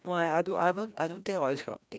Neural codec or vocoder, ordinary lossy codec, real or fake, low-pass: codec, 16 kHz, 1 kbps, FreqCodec, larger model; none; fake; none